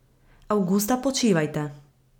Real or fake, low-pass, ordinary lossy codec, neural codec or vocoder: real; 19.8 kHz; none; none